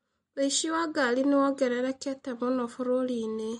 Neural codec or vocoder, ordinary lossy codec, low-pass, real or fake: none; MP3, 48 kbps; 19.8 kHz; real